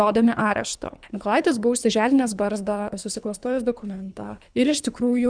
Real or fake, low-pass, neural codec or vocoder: fake; 9.9 kHz; codec, 24 kHz, 3 kbps, HILCodec